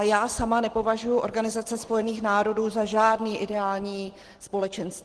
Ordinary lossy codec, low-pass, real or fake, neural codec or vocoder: Opus, 16 kbps; 10.8 kHz; real; none